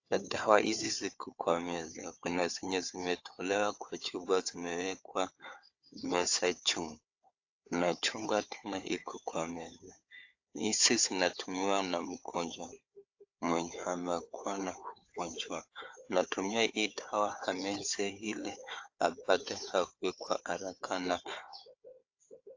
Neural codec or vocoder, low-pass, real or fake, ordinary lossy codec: codec, 16 kHz, 4 kbps, FunCodec, trained on Chinese and English, 50 frames a second; 7.2 kHz; fake; AAC, 48 kbps